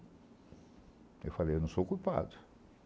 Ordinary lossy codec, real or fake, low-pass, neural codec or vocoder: none; real; none; none